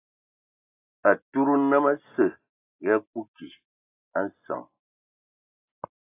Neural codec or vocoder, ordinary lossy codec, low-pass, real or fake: none; AAC, 24 kbps; 3.6 kHz; real